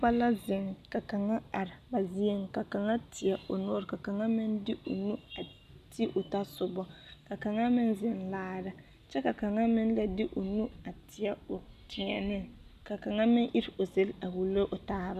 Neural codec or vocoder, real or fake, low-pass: none; real; 14.4 kHz